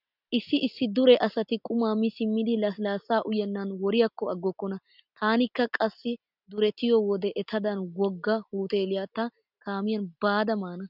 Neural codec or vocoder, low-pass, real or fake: none; 5.4 kHz; real